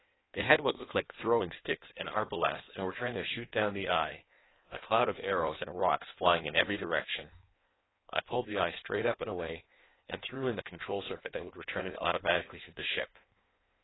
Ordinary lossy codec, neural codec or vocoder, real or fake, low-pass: AAC, 16 kbps; codec, 16 kHz in and 24 kHz out, 1.1 kbps, FireRedTTS-2 codec; fake; 7.2 kHz